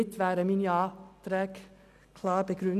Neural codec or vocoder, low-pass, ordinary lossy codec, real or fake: none; 14.4 kHz; none; real